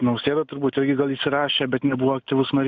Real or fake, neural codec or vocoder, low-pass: real; none; 7.2 kHz